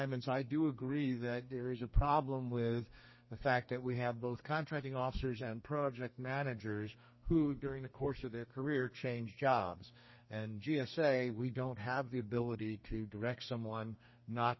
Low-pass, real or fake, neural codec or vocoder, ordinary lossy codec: 7.2 kHz; fake; codec, 32 kHz, 1.9 kbps, SNAC; MP3, 24 kbps